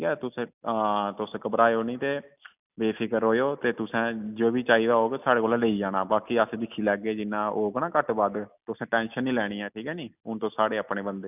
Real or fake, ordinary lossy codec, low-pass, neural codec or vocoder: real; none; 3.6 kHz; none